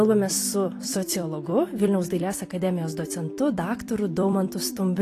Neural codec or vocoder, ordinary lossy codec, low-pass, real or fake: vocoder, 44.1 kHz, 128 mel bands every 256 samples, BigVGAN v2; AAC, 48 kbps; 14.4 kHz; fake